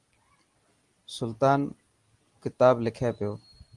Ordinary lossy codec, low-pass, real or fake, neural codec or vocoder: Opus, 24 kbps; 10.8 kHz; real; none